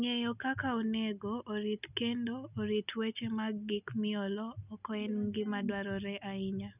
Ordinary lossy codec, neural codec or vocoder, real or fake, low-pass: none; none; real; 3.6 kHz